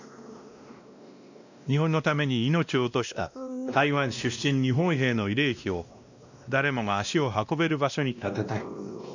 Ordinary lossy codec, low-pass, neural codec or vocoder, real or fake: none; 7.2 kHz; codec, 16 kHz, 1 kbps, X-Codec, WavLM features, trained on Multilingual LibriSpeech; fake